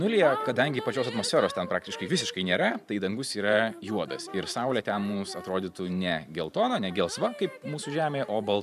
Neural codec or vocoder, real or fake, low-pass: vocoder, 44.1 kHz, 128 mel bands every 512 samples, BigVGAN v2; fake; 14.4 kHz